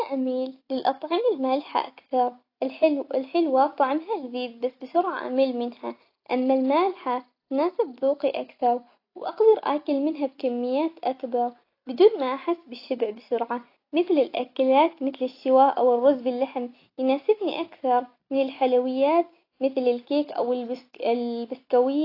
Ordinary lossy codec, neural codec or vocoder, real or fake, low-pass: AAC, 32 kbps; none; real; 5.4 kHz